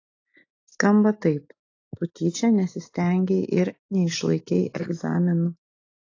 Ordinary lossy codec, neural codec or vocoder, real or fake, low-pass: AAC, 32 kbps; none; real; 7.2 kHz